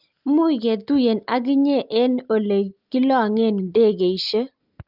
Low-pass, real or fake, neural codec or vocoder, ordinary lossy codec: 5.4 kHz; real; none; Opus, 24 kbps